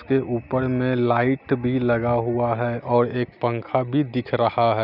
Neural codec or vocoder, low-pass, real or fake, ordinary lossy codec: none; 5.4 kHz; real; none